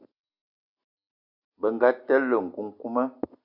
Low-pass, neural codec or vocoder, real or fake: 5.4 kHz; none; real